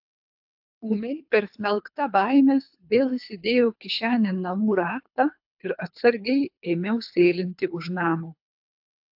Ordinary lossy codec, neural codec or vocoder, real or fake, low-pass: AAC, 48 kbps; codec, 24 kHz, 3 kbps, HILCodec; fake; 5.4 kHz